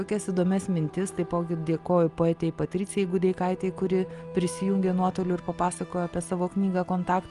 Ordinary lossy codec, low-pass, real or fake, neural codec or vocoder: Opus, 32 kbps; 10.8 kHz; real; none